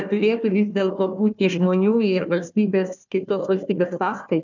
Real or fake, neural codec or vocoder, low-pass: fake; codec, 16 kHz, 1 kbps, FunCodec, trained on Chinese and English, 50 frames a second; 7.2 kHz